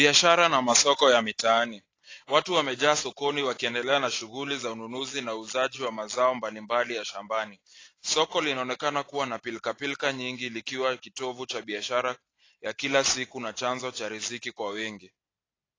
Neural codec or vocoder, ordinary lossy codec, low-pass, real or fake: none; AAC, 32 kbps; 7.2 kHz; real